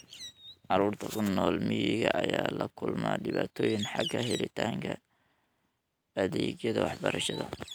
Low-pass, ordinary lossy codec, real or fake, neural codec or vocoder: none; none; real; none